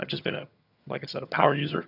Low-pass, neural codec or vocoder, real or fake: 5.4 kHz; vocoder, 22.05 kHz, 80 mel bands, HiFi-GAN; fake